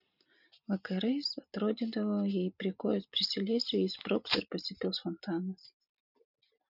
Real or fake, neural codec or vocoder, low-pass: real; none; 5.4 kHz